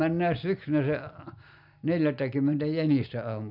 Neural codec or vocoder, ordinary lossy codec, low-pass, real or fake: none; none; 5.4 kHz; real